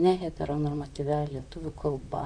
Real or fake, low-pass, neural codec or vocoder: fake; 9.9 kHz; vocoder, 44.1 kHz, 128 mel bands, Pupu-Vocoder